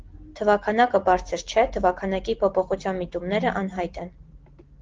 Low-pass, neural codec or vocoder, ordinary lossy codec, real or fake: 7.2 kHz; none; Opus, 16 kbps; real